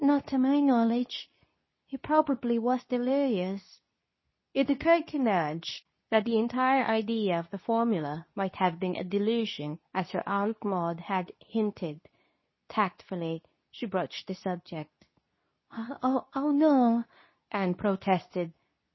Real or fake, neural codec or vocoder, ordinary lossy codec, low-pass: fake; codec, 24 kHz, 0.9 kbps, WavTokenizer, medium speech release version 2; MP3, 24 kbps; 7.2 kHz